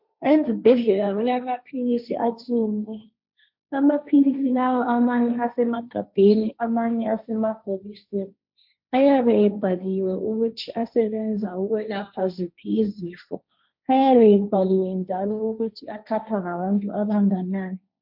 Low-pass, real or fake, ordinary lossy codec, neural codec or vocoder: 5.4 kHz; fake; MP3, 48 kbps; codec, 16 kHz, 1.1 kbps, Voila-Tokenizer